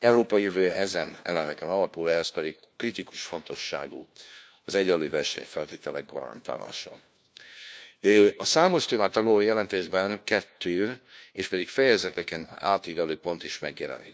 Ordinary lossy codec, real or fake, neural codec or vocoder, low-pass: none; fake; codec, 16 kHz, 1 kbps, FunCodec, trained on LibriTTS, 50 frames a second; none